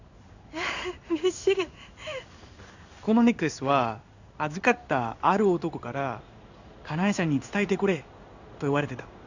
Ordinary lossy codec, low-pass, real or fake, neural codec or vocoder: none; 7.2 kHz; fake; codec, 16 kHz in and 24 kHz out, 1 kbps, XY-Tokenizer